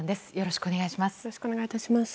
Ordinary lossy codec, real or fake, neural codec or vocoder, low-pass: none; real; none; none